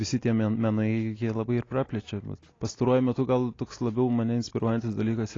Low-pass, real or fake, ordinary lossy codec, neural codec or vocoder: 7.2 kHz; real; AAC, 32 kbps; none